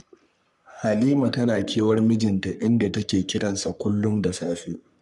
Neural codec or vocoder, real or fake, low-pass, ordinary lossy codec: codec, 44.1 kHz, 3.4 kbps, Pupu-Codec; fake; 10.8 kHz; none